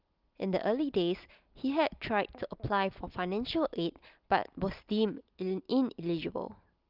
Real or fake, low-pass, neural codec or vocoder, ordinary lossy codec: real; 5.4 kHz; none; Opus, 24 kbps